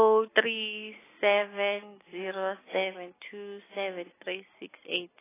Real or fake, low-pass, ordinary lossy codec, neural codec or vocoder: real; 3.6 kHz; AAC, 16 kbps; none